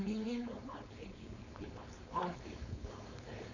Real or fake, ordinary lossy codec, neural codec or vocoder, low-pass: fake; none; codec, 16 kHz, 4.8 kbps, FACodec; 7.2 kHz